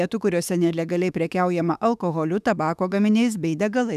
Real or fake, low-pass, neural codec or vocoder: fake; 14.4 kHz; autoencoder, 48 kHz, 32 numbers a frame, DAC-VAE, trained on Japanese speech